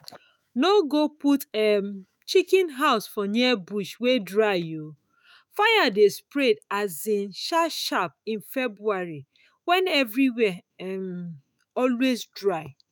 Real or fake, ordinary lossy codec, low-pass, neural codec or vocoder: fake; none; none; autoencoder, 48 kHz, 128 numbers a frame, DAC-VAE, trained on Japanese speech